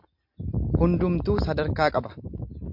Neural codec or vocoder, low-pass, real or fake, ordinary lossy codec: none; 5.4 kHz; real; MP3, 48 kbps